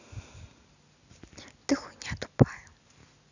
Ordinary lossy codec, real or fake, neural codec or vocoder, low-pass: none; real; none; 7.2 kHz